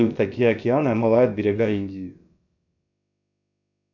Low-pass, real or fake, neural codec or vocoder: 7.2 kHz; fake; codec, 16 kHz, about 1 kbps, DyCAST, with the encoder's durations